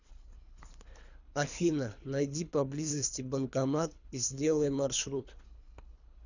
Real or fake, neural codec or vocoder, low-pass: fake; codec, 24 kHz, 3 kbps, HILCodec; 7.2 kHz